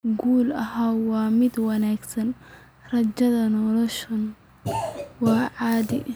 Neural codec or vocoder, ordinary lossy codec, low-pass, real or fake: none; none; none; real